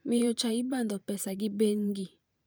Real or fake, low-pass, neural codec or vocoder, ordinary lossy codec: fake; none; vocoder, 44.1 kHz, 128 mel bands every 512 samples, BigVGAN v2; none